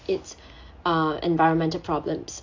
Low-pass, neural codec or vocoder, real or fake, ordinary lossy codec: 7.2 kHz; none; real; AAC, 48 kbps